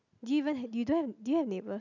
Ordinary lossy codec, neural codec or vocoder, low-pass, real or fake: none; none; 7.2 kHz; real